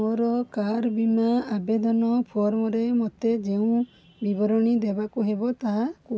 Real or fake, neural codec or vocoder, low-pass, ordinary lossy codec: real; none; none; none